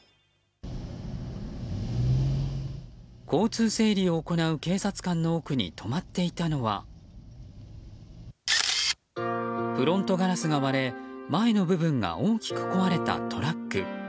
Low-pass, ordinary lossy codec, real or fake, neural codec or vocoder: none; none; real; none